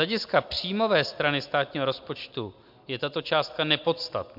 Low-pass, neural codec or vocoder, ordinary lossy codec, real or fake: 5.4 kHz; none; MP3, 48 kbps; real